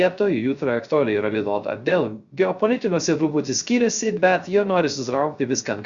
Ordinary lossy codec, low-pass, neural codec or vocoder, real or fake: Opus, 64 kbps; 7.2 kHz; codec, 16 kHz, 0.3 kbps, FocalCodec; fake